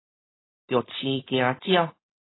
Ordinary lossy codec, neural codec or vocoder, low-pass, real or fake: AAC, 16 kbps; codec, 16 kHz, 4.8 kbps, FACodec; 7.2 kHz; fake